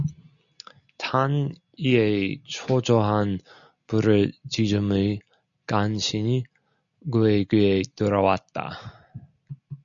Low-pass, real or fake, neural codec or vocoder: 7.2 kHz; real; none